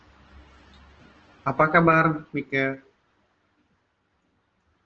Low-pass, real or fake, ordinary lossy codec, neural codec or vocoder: 7.2 kHz; real; Opus, 16 kbps; none